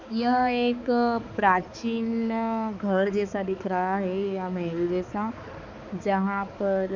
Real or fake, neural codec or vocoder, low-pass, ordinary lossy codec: fake; codec, 16 kHz, 4 kbps, X-Codec, HuBERT features, trained on balanced general audio; 7.2 kHz; MP3, 64 kbps